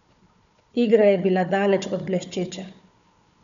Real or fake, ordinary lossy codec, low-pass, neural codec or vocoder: fake; none; 7.2 kHz; codec, 16 kHz, 4 kbps, FunCodec, trained on Chinese and English, 50 frames a second